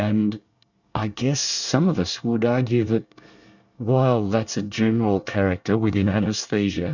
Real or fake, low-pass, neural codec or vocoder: fake; 7.2 kHz; codec, 24 kHz, 1 kbps, SNAC